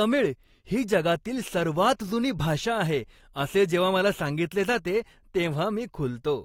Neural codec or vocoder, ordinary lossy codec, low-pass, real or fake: none; AAC, 48 kbps; 19.8 kHz; real